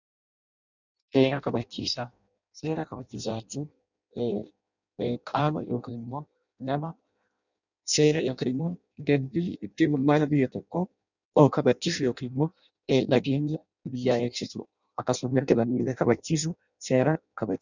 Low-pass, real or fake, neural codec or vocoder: 7.2 kHz; fake; codec, 16 kHz in and 24 kHz out, 0.6 kbps, FireRedTTS-2 codec